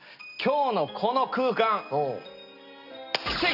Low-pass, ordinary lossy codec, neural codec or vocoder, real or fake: 5.4 kHz; none; none; real